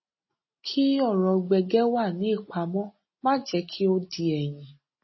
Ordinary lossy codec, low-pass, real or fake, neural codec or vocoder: MP3, 24 kbps; 7.2 kHz; real; none